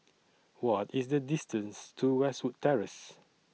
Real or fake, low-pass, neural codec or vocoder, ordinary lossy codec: real; none; none; none